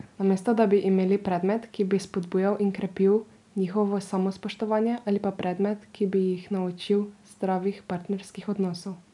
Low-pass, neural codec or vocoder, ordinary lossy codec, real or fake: 10.8 kHz; none; none; real